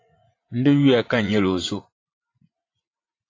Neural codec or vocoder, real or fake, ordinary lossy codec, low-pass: vocoder, 44.1 kHz, 80 mel bands, Vocos; fake; AAC, 32 kbps; 7.2 kHz